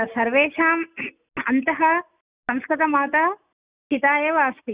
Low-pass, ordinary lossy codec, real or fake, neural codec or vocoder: 3.6 kHz; Opus, 64 kbps; real; none